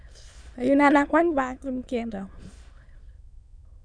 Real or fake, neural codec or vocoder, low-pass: fake; autoencoder, 22.05 kHz, a latent of 192 numbers a frame, VITS, trained on many speakers; 9.9 kHz